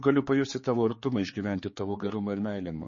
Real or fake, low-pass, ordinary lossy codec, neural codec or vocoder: fake; 7.2 kHz; MP3, 32 kbps; codec, 16 kHz, 4 kbps, X-Codec, HuBERT features, trained on general audio